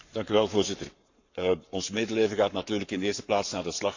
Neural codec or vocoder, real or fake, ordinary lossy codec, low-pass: codec, 44.1 kHz, 7.8 kbps, Pupu-Codec; fake; none; 7.2 kHz